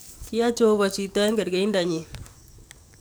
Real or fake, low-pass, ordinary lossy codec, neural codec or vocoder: fake; none; none; codec, 44.1 kHz, 7.8 kbps, DAC